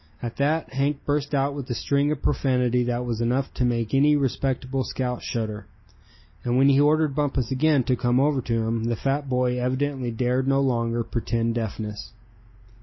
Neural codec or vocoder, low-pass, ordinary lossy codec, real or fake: none; 7.2 kHz; MP3, 24 kbps; real